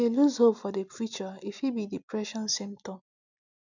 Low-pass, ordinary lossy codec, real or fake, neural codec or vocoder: 7.2 kHz; none; real; none